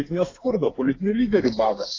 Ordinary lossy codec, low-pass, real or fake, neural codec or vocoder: AAC, 32 kbps; 7.2 kHz; fake; codec, 32 kHz, 1.9 kbps, SNAC